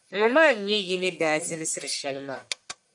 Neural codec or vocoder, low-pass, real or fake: codec, 44.1 kHz, 1.7 kbps, Pupu-Codec; 10.8 kHz; fake